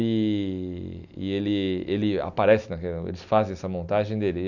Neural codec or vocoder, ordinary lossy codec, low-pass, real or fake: none; none; 7.2 kHz; real